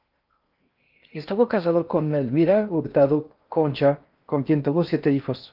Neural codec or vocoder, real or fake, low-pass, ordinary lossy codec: codec, 16 kHz in and 24 kHz out, 0.6 kbps, FocalCodec, streaming, 4096 codes; fake; 5.4 kHz; Opus, 24 kbps